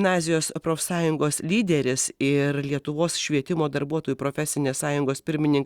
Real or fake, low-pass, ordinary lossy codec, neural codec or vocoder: real; 19.8 kHz; Opus, 64 kbps; none